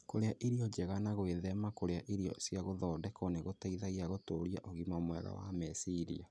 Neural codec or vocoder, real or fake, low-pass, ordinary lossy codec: none; real; none; none